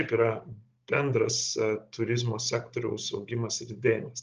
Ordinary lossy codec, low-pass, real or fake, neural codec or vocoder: Opus, 24 kbps; 7.2 kHz; fake; codec, 16 kHz, 16 kbps, FunCodec, trained on Chinese and English, 50 frames a second